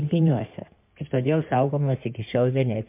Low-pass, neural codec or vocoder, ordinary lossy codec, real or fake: 3.6 kHz; codec, 16 kHz in and 24 kHz out, 2.2 kbps, FireRedTTS-2 codec; MP3, 32 kbps; fake